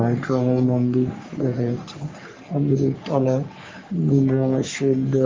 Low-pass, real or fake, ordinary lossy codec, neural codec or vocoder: 7.2 kHz; fake; Opus, 32 kbps; codec, 44.1 kHz, 3.4 kbps, Pupu-Codec